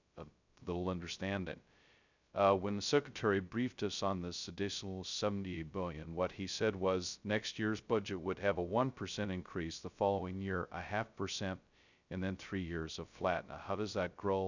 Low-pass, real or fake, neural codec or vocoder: 7.2 kHz; fake; codec, 16 kHz, 0.2 kbps, FocalCodec